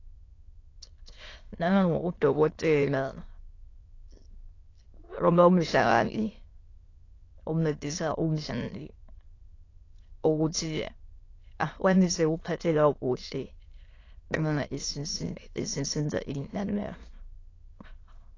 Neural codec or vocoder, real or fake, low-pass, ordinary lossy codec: autoencoder, 22.05 kHz, a latent of 192 numbers a frame, VITS, trained on many speakers; fake; 7.2 kHz; AAC, 32 kbps